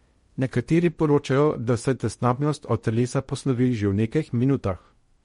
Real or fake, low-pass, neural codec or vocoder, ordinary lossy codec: fake; 10.8 kHz; codec, 16 kHz in and 24 kHz out, 0.6 kbps, FocalCodec, streaming, 2048 codes; MP3, 48 kbps